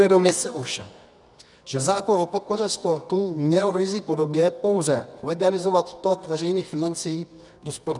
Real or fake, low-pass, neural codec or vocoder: fake; 10.8 kHz; codec, 24 kHz, 0.9 kbps, WavTokenizer, medium music audio release